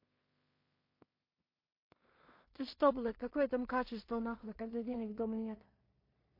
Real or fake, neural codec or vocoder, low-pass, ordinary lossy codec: fake; codec, 16 kHz in and 24 kHz out, 0.4 kbps, LongCat-Audio-Codec, two codebook decoder; 5.4 kHz; MP3, 32 kbps